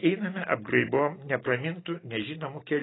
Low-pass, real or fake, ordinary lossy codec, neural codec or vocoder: 7.2 kHz; real; AAC, 16 kbps; none